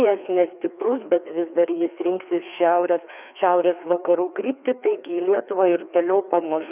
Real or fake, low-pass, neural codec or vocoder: fake; 3.6 kHz; codec, 16 kHz, 2 kbps, FreqCodec, larger model